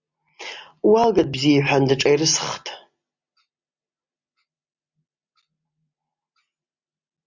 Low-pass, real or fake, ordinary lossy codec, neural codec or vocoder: 7.2 kHz; real; Opus, 64 kbps; none